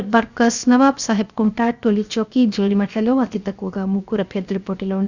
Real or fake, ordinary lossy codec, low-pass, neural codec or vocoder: fake; Opus, 64 kbps; 7.2 kHz; codec, 16 kHz, 0.7 kbps, FocalCodec